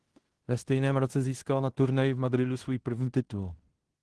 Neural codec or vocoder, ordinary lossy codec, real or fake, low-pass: codec, 16 kHz in and 24 kHz out, 0.9 kbps, LongCat-Audio-Codec, fine tuned four codebook decoder; Opus, 16 kbps; fake; 10.8 kHz